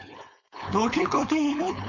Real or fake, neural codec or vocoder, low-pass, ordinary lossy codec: fake; codec, 16 kHz, 4.8 kbps, FACodec; 7.2 kHz; none